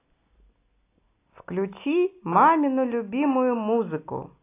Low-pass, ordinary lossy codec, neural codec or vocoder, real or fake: 3.6 kHz; AAC, 24 kbps; none; real